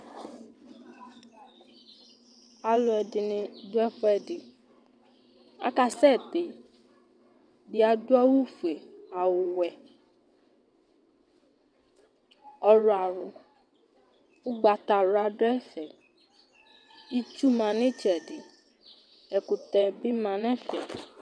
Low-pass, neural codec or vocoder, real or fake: 9.9 kHz; vocoder, 22.05 kHz, 80 mel bands, WaveNeXt; fake